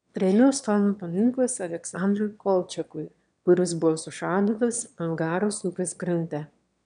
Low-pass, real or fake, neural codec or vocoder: 9.9 kHz; fake; autoencoder, 22.05 kHz, a latent of 192 numbers a frame, VITS, trained on one speaker